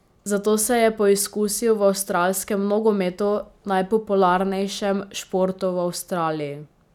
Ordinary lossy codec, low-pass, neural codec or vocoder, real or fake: none; 19.8 kHz; none; real